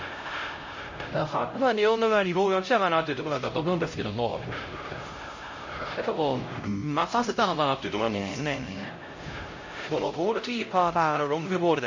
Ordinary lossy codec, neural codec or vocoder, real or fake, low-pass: MP3, 32 kbps; codec, 16 kHz, 0.5 kbps, X-Codec, HuBERT features, trained on LibriSpeech; fake; 7.2 kHz